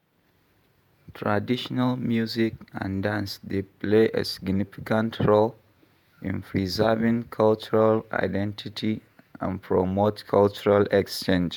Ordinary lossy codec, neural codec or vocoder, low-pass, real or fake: MP3, 96 kbps; vocoder, 48 kHz, 128 mel bands, Vocos; 19.8 kHz; fake